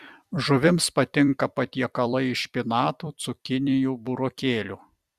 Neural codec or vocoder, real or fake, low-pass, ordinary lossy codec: vocoder, 44.1 kHz, 128 mel bands every 256 samples, BigVGAN v2; fake; 14.4 kHz; Opus, 64 kbps